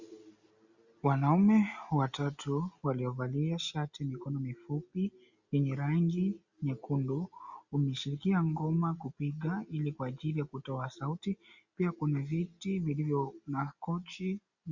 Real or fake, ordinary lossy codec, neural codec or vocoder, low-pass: real; Opus, 64 kbps; none; 7.2 kHz